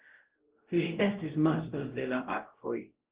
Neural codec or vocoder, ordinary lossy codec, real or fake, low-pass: codec, 16 kHz, 0.5 kbps, X-Codec, WavLM features, trained on Multilingual LibriSpeech; Opus, 16 kbps; fake; 3.6 kHz